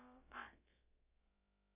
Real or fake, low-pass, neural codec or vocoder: fake; 3.6 kHz; codec, 16 kHz, about 1 kbps, DyCAST, with the encoder's durations